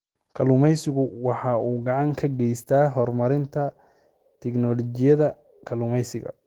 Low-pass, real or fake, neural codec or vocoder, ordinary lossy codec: 19.8 kHz; fake; autoencoder, 48 kHz, 128 numbers a frame, DAC-VAE, trained on Japanese speech; Opus, 16 kbps